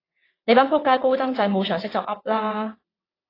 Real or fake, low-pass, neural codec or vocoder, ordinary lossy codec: fake; 5.4 kHz; vocoder, 22.05 kHz, 80 mel bands, WaveNeXt; AAC, 24 kbps